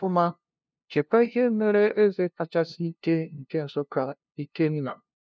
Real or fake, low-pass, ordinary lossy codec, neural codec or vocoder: fake; none; none; codec, 16 kHz, 0.5 kbps, FunCodec, trained on LibriTTS, 25 frames a second